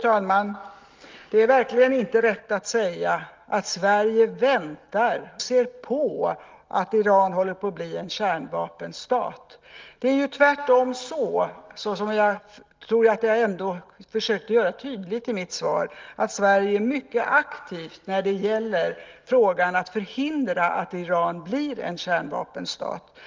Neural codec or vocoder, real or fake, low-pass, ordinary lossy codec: none; real; 7.2 kHz; Opus, 32 kbps